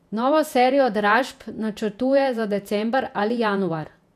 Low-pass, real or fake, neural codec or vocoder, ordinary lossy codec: 14.4 kHz; fake; vocoder, 48 kHz, 128 mel bands, Vocos; none